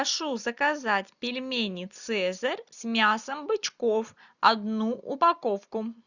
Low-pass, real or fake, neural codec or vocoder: 7.2 kHz; real; none